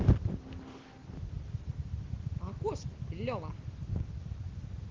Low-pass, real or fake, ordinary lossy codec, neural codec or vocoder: 7.2 kHz; real; Opus, 16 kbps; none